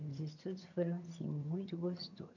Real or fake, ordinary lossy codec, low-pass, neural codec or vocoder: fake; none; 7.2 kHz; vocoder, 22.05 kHz, 80 mel bands, HiFi-GAN